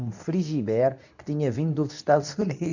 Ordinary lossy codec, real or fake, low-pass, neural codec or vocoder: none; fake; 7.2 kHz; codec, 24 kHz, 0.9 kbps, WavTokenizer, medium speech release version 2